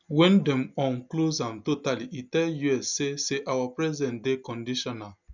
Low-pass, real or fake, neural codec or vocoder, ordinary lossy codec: 7.2 kHz; real; none; none